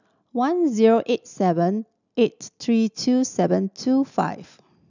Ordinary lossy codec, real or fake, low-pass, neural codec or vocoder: none; real; 7.2 kHz; none